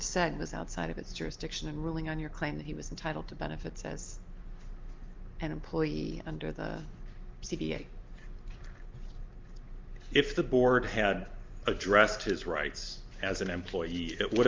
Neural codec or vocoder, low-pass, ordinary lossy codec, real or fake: none; 7.2 kHz; Opus, 24 kbps; real